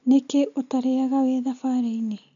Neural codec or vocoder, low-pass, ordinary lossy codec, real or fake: none; 7.2 kHz; none; real